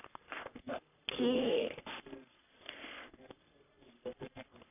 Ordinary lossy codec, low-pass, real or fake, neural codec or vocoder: none; 3.6 kHz; fake; codec, 44.1 kHz, 3.4 kbps, Pupu-Codec